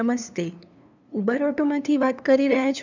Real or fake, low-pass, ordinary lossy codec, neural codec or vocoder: fake; 7.2 kHz; none; codec, 16 kHz, 2 kbps, FunCodec, trained on LibriTTS, 25 frames a second